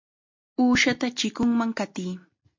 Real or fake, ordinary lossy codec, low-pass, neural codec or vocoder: real; MP3, 48 kbps; 7.2 kHz; none